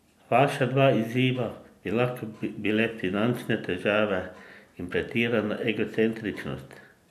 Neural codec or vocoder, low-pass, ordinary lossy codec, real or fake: none; 14.4 kHz; none; real